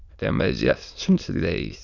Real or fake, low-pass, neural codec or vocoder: fake; 7.2 kHz; autoencoder, 22.05 kHz, a latent of 192 numbers a frame, VITS, trained on many speakers